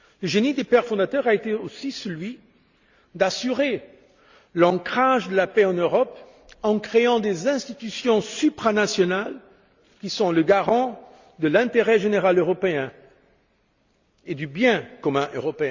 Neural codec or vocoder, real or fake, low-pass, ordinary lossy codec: none; real; 7.2 kHz; Opus, 64 kbps